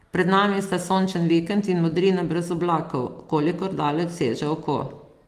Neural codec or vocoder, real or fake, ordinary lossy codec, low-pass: none; real; Opus, 24 kbps; 14.4 kHz